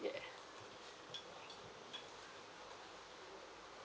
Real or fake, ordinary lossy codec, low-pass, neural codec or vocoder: real; none; none; none